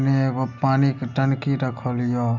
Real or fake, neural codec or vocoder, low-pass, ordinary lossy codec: real; none; 7.2 kHz; none